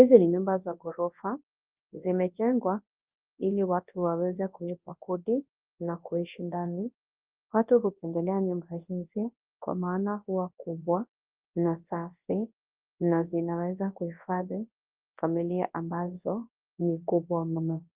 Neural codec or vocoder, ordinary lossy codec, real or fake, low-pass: codec, 24 kHz, 0.9 kbps, WavTokenizer, large speech release; Opus, 32 kbps; fake; 3.6 kHz